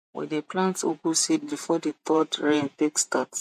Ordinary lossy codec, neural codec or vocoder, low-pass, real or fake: MP3, 64 kbps; vocoder, 48 kHz, 128 mel bands, Vocos; 14.4 kHz; fake